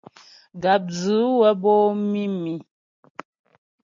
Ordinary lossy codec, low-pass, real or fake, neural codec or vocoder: MP3, 48 kbps; 7.2 kHz; real; none